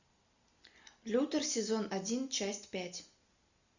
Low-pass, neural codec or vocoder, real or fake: 7.2 kHz; none; real